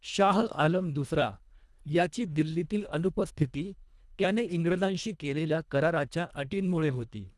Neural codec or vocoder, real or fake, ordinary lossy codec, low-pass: codec, 24 kHz, 1.5 kbps, HILCodec; fake; none; none